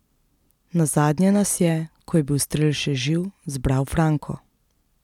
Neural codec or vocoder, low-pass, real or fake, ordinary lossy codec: none; 19.8 kHz; real; none